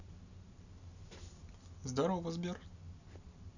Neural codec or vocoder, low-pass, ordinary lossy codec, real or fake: none; 7.2 kHz; none; real